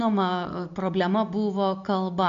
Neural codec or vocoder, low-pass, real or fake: none; 7.2 kHz; real